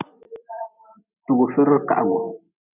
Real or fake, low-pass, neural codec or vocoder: real; 3.6 kHz; none